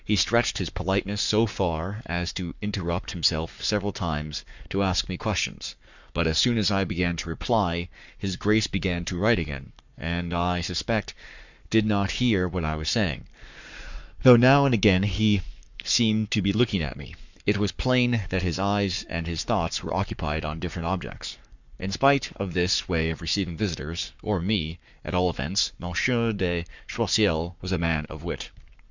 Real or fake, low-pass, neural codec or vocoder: fake; 7.2 kHz; codec, 44.1 kHz, 7.8 kbps, Pupu-Codec